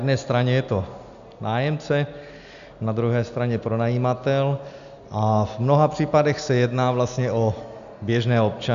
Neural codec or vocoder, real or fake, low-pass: none; real; 7.2 kHz